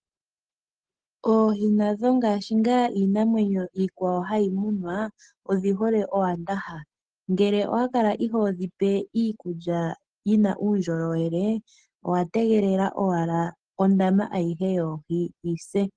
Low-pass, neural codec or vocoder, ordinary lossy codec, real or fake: 9.9 kHz; none; Opus, 16 kbps; real